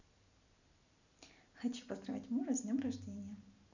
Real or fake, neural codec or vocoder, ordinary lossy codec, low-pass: real; none; none; 7.2 kHz